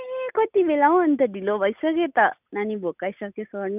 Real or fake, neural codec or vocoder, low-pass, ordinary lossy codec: real; none; 3.6 kHz; none